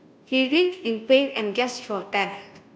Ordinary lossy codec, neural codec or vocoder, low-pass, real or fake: none; codec, 16 kHz, 0.5 kbps, FunCodec, trained on Chinese and English, 25 frames a second; none; fake